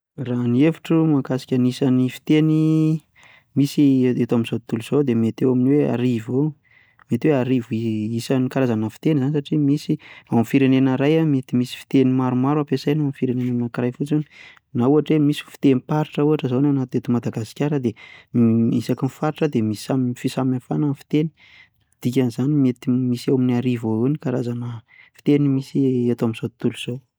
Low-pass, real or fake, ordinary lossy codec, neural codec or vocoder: none; real; none; none